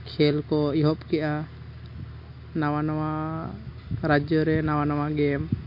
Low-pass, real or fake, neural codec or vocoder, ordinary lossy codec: 5.4 kHz; real; none; MP3, 32 kbps